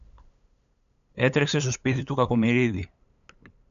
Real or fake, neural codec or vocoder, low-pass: fake; codec, 16 kHz, 8 kbps, FunCodec, trained on LibriTTS, 25 frames a second; 7.2 kHz